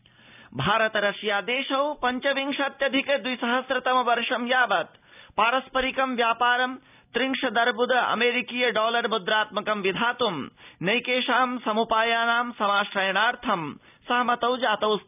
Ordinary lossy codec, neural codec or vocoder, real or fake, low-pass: none; none; real; 3.6 kHz